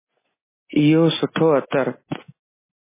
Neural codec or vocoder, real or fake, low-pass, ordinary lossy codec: none; real; 3.6 kHz; MP3, 16 kbps